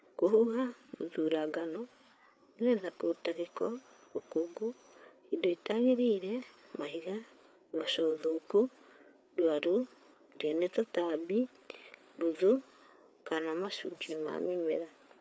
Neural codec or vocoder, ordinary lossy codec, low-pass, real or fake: codec, 16 kHz, 4 kbps, FreqCodec, larger model; none; none; fake